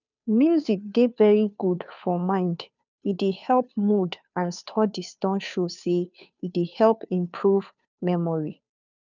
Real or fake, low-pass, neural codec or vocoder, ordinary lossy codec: fake; 7.2 kHz; codec, 16 kHz, 2 kbps, FunCodec, trained on Chinese and English, 25 frames a second; none